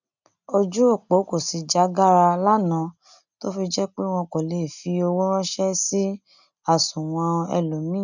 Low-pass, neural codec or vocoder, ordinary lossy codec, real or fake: 7.2 kHz; none; none; real